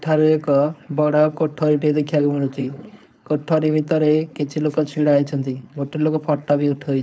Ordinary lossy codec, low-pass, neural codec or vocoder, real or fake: none; none; codec, 16 kHz, 4.8 kbps, FACodec; fake